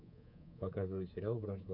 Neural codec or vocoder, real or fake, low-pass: codec, 24 kHz, 3.1 kbps, DualCodec; fake; 5.4 kHz